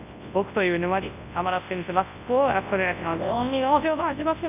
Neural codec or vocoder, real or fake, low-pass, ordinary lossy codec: codec, 24 kHz, 0.9 kbps, WavTokenizer, large speech release; fake; 3.6 kHz; none